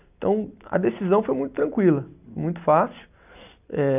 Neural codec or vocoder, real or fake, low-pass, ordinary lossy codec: none; real; 3.6 kHz; none